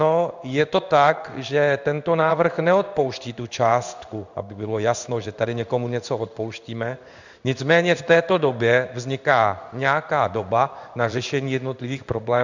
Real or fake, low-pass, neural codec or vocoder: fake; 7.2 kHz; codec, 16 kHz in and 24 kHz out, 1 kbps, XY-Tokenizer